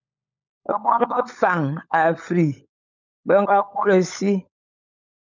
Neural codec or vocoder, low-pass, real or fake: codec, 16 kHz, 16 kbps, FunCodec, trained on LibriTTS, 50 frames a second; 7.2 kHz; fake